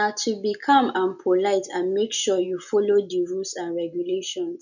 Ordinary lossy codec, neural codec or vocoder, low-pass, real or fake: none; none; 7.2 kHz; real